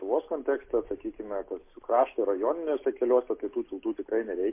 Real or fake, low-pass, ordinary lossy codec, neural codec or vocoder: real; 3.6 kHz; MP3, 24 kbps; none